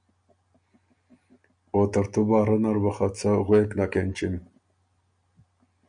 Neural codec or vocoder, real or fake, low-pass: none; real; 9.9 kHz